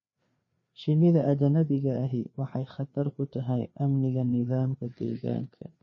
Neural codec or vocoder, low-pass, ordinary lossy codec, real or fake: codec, 16 kHz, 4 kbps, FreqCodec, larger model; 7.2 kHz; MP3, 32 kbps; fake